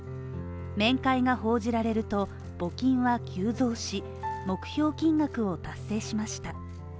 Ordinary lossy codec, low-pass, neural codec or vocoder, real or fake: none; none; none; real